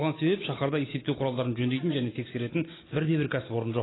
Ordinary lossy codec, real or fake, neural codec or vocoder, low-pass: AAC, 16 kbps; real; none; 7.2 kHz